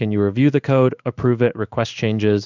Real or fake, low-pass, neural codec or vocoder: fake; 7.2 kHz; codec, 16 kHz in and 24 kHz out, 1 kbps, XY-Tokenizer